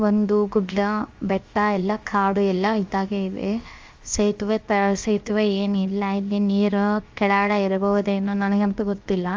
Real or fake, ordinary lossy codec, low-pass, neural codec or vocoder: fake; Opus, 32 kbps; 7.2 kHz; codec, 16 kHz, 0.7 kbps, FocalCodec